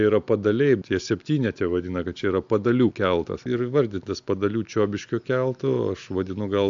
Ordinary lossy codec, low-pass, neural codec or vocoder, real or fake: AAC, 64 kbps; 7.2 kHz; none; real